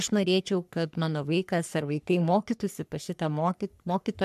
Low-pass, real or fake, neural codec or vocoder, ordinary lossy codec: 14.4 kHz; fake; codec, 44.1 kHz, 3.4 kbps, Pupu-Codec; MP3, 96 kbps